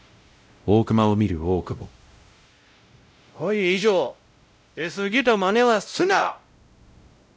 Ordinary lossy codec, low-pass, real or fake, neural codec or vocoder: none; none; fake; codec, 16 kHz, 0.5 kbps, X-Codec, WavLM features, trained on Multilingual LibriSpeech